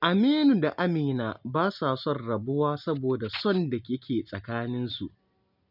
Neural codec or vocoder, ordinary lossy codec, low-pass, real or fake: none; none; 5.4 kHz; real